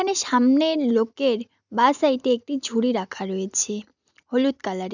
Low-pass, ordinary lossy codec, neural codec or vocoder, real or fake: 7.2 kHz; none; none; real